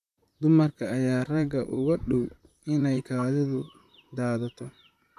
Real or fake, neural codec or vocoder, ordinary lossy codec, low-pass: fake; vocoder, 44.1 kHz, 128 mel bands, Pupu-Vocoder; none; 14.4 kHz